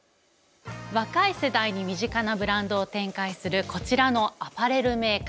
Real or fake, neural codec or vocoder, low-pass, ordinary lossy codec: real; none; none; none